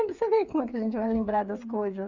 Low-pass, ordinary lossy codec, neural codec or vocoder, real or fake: 7.2 kHz; Opus, 64 kbps; codec, 16 kHz, 8 kbps, FreqCodec, smaller model; fake